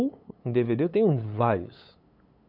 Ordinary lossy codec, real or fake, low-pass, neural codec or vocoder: none; fake; 5.4 kHz; codec, 16 kHz, 8 kbps, FunCodec, trained on LibriTTS, 25 frames a second